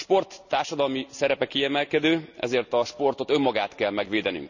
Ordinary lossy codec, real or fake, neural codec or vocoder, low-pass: none; real; none; 7.2 kHz